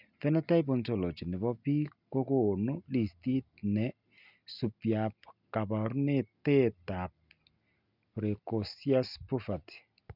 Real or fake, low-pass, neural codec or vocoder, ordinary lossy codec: real; 5.4 kHz; none; none